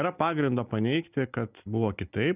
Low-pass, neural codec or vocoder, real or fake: 3.6 kHz; none; real